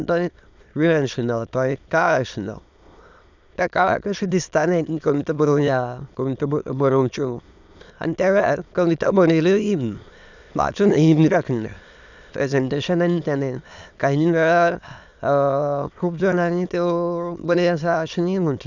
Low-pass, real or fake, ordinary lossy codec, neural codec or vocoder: 7.2 kHz; fake; none; autoencoder, 22.05 kHz, a latent of 192 numbers a frame, VITS, trained on many speakers